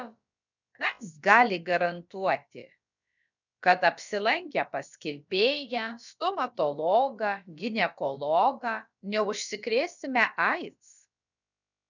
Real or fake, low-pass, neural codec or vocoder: fake; 7.2 kHz; codec, 16 kHz, about 1 kbps, DyCAST, with the encoder's durations